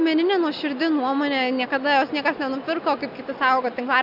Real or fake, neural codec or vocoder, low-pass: real; none; 5.4 kHz